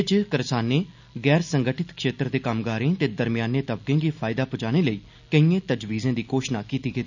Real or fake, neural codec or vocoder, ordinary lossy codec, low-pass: real; none; none; 7.2 kHz